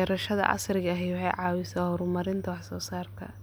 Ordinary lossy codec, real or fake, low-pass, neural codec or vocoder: none; real; none; none